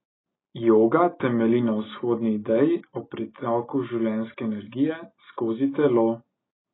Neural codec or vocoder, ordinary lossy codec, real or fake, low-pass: none; AAC, 16 kbps; real; 7.2 kHz